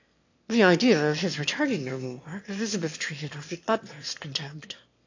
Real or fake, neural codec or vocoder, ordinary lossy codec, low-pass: fake; autoencoder, 22.05 kHz, a latent of 192 numbers a frame, VITS, trained on one speaker; AAC, 48 kbps; 7.2 kHz